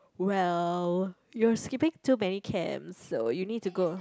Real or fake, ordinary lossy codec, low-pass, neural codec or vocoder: real; none; none; none